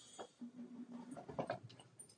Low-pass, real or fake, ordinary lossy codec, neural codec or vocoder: 9.9 kHz; fake; AAC, 64 kbps; vocoder, 44.1 kHz, 128 mel bands every 512 samples, BigVGAN v2